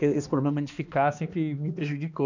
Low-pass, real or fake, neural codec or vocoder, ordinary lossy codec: 7.2 kHz; fake; codec, 16 kHz, 2 kbps, X-Codec, HuBERT features, trained on balanced general audio; none